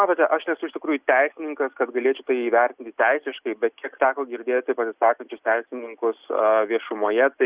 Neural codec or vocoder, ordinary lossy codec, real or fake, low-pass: none; Opus, 64 kbps; real; 3.6 kHz